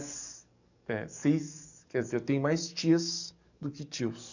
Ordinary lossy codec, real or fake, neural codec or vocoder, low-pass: none; fake; codec, 44.1 kHz, 7.8 kbps, DAC; 7.2 kHz